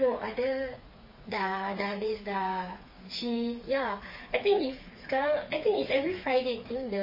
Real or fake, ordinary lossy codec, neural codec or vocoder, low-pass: fake; MP3, 24 kbps; codec, 16 kHz, 4 kbps, FreqCodec, smaller model; 5.4 kHz